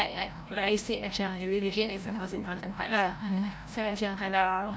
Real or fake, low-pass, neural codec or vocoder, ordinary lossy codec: fake; none; codec, 16 kHz, 0.5 kbps, FreqCodec, larger model; none